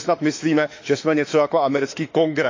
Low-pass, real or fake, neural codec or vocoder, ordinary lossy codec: 7.2 kHz; fake; autoencoder, 48 kHz, 32 numbers a frame, DAC-VAE, trained on Japanese speech; AAC, 48 kbps